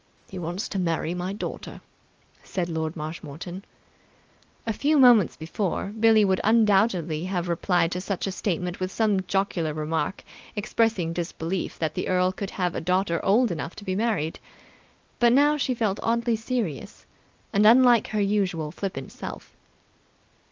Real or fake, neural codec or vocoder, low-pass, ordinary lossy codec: real; none; 7.2 kHz; Opus, 24 kbps